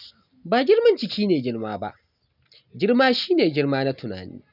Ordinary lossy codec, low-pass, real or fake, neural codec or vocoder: none; 5.4 kHz; real; none